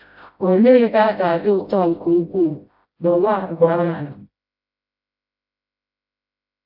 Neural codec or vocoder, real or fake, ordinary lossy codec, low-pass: codec, 16 kHz, 0.5 kbps, FreqCodec, smaller model; fake; AAC, 48 kbps; 5.4 kHz